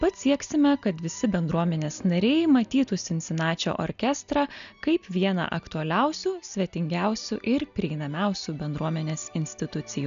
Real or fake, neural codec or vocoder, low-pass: real; none; 7.2 kHz